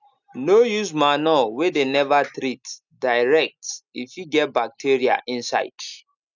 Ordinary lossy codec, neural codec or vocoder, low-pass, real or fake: none; none; 7.2 kHz; real